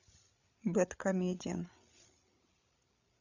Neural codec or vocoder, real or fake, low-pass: codec, 16 kHz, 8 kbps, FreqCodec, larger model; fake; 7.2 kHz